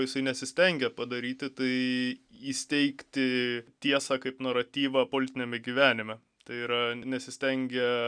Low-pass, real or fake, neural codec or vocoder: 9.9 kHz; real; none